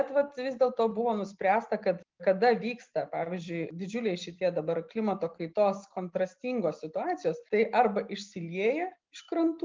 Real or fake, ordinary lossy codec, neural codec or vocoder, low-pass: real; Opus, 24 kbps; none; 7.2 kHz